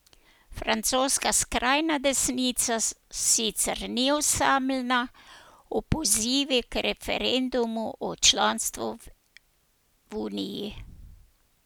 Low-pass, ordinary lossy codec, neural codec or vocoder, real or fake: none; none; none; real